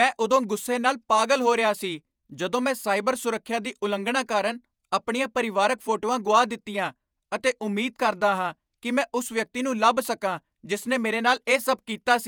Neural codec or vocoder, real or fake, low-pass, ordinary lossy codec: vocoder, 48 kHz, 128 mel bands, Vocos; fake; none; none